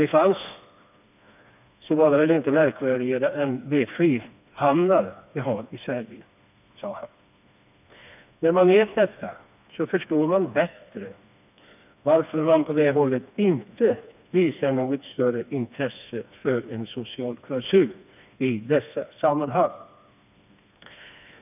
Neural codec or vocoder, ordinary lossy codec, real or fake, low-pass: codec, 16 kHz, 2 kbps, FreqCodec, smaller model; none; fake; 3.6 kHz